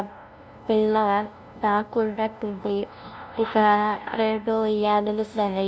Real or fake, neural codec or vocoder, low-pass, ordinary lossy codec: fake; codec, 16 kHz, 0.5 kbps, FunCodec, trained on LibriTTS, 25 frames a second; none; none